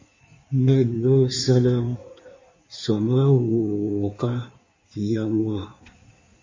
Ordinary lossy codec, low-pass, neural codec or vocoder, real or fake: MP3, 32 kbps; 7.2 kHz; codec, 16 kHz in and 24 kHz out, 1.1 kbps, FireRedTTS-2 codec; fake